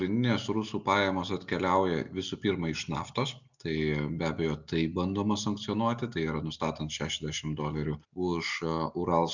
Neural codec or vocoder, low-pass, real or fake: none; 7.2 kHz; real